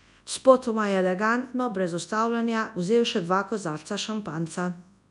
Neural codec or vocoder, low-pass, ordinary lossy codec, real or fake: codec, 24 kHz, 0.9 kbps, WavTokenizer, large speech release; 10.8 kHz; none; fake